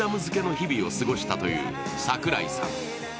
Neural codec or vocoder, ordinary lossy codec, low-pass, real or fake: none; none; none; real